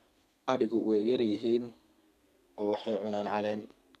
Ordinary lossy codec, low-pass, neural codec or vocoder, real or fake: none; 14.4 kHz; codec, 32 kHz, 1.9 kbps, SNAC; fake